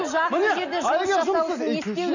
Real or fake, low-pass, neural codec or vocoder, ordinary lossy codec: real; 7.2 kHz; none; none